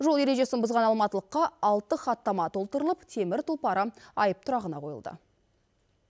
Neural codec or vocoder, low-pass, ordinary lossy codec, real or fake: none; none; none; real